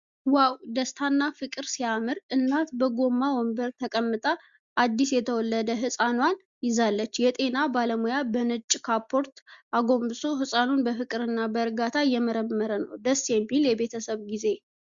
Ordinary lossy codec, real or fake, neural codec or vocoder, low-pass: Opus, 64 kbps; real; none; 7.2 kHz